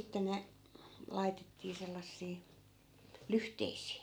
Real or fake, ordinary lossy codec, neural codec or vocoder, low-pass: real; none; none; none